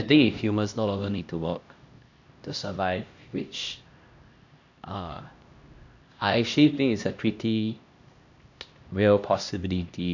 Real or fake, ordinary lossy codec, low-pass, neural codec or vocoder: fake; none; 7.2 kHz; codec, 16 kHz, 1 kbps, X-Codec, HuBERT features, trained on LibriSpeech